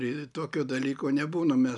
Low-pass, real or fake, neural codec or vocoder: 10.8 kHz; real; none